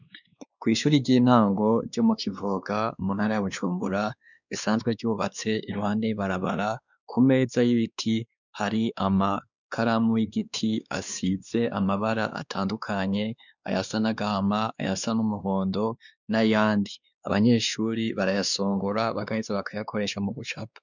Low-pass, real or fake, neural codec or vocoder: 7.2 kHz; fake; codec, 16 kHz, 2 kbps, X-Codec, WavLM features, trained on Multilingual LibriSpeech